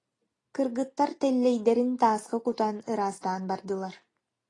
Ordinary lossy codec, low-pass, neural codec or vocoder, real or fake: AAC, 32 kbps; 10.8 kHz; none; real